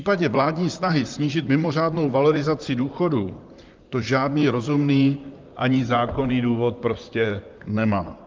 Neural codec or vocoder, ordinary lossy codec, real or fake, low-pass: vocoder, 44.1 kHz, 128 mel bands, Pupu-Vocoder; Opus, 24 kbps; fake; 7.2 kHz